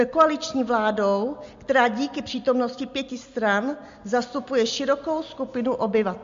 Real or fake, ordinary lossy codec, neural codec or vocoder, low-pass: real; MP3, 48 kbps; none; 7.2 kHz